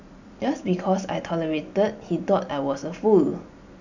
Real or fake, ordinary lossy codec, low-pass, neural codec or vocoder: real; none; 7.2 kHz; none